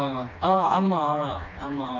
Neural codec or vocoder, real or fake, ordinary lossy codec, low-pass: codec, 16 kHz, 2 kbps, FreqCodec, smaller model; fake; none; 7.2 kHz